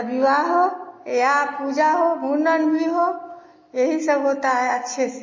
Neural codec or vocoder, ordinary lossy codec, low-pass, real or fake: none; MP3, 32 kbps; 7.2 kHz; real